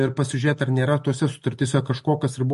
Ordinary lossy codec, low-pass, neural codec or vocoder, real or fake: MP3, 48 kbps; 14.4 kHz; none; real